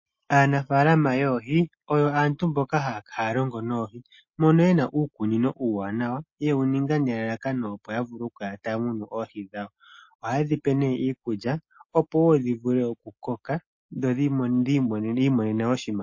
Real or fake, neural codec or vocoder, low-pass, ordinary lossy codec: real; none; 7.2 kHz; MP3, 32 kbps